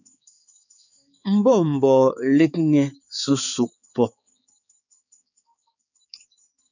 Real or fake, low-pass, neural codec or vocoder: fake; 7.2 kHz; codec, 16 kHz, 4 kbps, X-Codec, HuBERT features, trained on balanced general audio